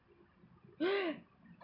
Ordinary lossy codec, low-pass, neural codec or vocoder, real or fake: none; 5.4 kHz; none; real